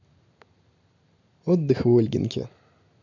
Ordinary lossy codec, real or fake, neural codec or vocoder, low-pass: none; real; none; 7.2 kHz